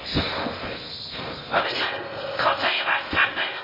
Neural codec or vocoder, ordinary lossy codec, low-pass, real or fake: codec, 16 kHz in and 24 kHz out, 0.6 kbps, FocalCodec, streaming, 4096 codes; MP3, 24 kbps; 5.4 kHz; fake